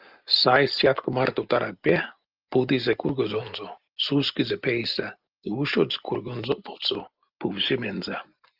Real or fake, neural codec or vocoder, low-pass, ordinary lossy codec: real; none; 5.4 kHz; Opus, 24 kbps